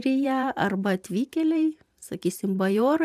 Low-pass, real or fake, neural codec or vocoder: 14.4 kHz; fake; vocoder, 44.1 kHz, 128 mel bands every 512 samples, BigVGAN v2